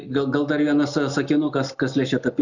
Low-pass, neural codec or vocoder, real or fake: 7.2 kHz; none; real